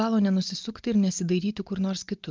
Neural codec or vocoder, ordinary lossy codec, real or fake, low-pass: none; Opus, 24 kbps; real; 7.2 kHz